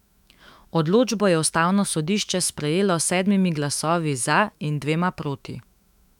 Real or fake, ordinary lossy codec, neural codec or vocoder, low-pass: fake; none; autoencoder, 48 kHz, 128 numbers a frame, DAC-VAE, trained on Japanese speech; 19.8 kHz